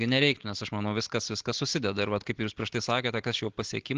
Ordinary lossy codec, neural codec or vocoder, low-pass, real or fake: Opus, 16 kbps; none; 7.2 kHz; real